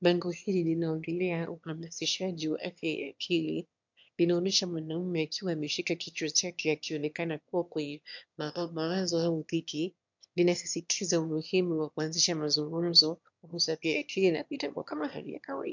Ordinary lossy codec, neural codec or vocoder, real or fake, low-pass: MP3, 64 kbps; autoencoder, 22.05 kHz, a latent of 192 numbers a frame, VITS, trained on one speaker; fake; 7.2 kHz